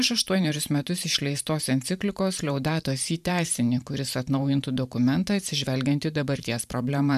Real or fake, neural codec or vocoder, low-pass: fake; vocoder, 44.1 kHz, 128 mel bands every 512 samples, BigVGAN v2; 14.4 kHz